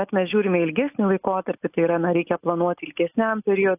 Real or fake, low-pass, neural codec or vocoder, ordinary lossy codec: real; 3.6 kHz; none; AAC, 24 kbps